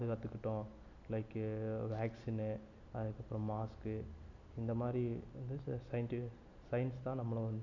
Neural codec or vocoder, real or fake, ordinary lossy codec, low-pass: none; real; none; 7.2 kHz